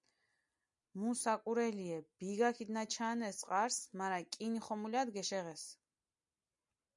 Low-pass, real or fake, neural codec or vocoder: 10.8 kHz; real; none